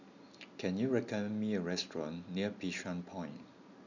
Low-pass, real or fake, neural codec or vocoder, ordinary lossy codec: 7.2 kHz; real; none; none